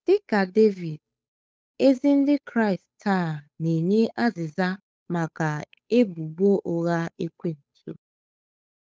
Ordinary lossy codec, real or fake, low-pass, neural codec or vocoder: none; fake; none; codec, 16 kHz, 8 kbps, FunCodec, trained on Chinese and English, 25 frames a second